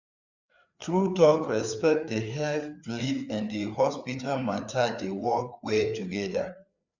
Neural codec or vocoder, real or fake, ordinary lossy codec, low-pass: codec, 16 kHz, 4 kbps, FreqCodec, larger model; fake; none; 7.2 kHz